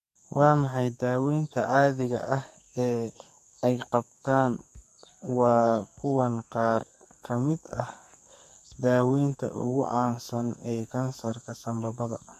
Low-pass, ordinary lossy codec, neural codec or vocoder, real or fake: 14.4 kHz; MP3, 64 kbps; codec, 44.1 kHz, 2.6 kbps, SNAC; fake